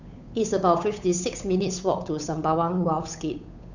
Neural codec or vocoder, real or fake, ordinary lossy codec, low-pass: codec, 16 kHz, 8 kbps, FunCodec, trained on Chinese and English, 25 frames a second; fake; none; 7.2 kHz